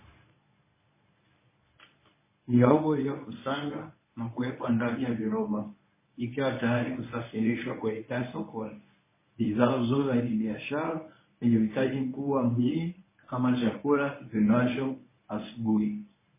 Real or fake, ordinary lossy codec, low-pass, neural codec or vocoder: fake; MP3, 16 kbps; 3.6 kHz; codec, 24 kHz, 0.9 kbps, WavTokenizer, medium speech release version 1